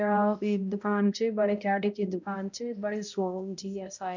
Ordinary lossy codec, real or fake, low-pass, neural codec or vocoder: none; fake; 7.2 kHz; codec, 16 kHz, 0.5 kbps, X-Codec, HuBERT features, trained on balanced general audio